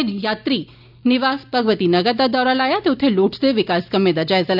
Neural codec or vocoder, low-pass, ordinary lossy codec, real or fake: none; 5.4 kHz; none; real